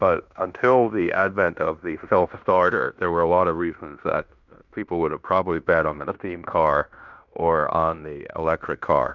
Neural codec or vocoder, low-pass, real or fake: codec, 16 kHz in and 24 kHz out, 0.9 kbps, LongCat-Audio-Codec, fine tuned four codebook decoder; 7.2 kHz; fake